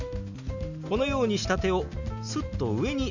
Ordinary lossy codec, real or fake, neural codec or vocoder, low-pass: none; real; none; 7.2 kHz